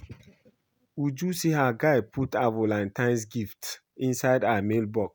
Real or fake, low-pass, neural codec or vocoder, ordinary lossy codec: real; none; none; none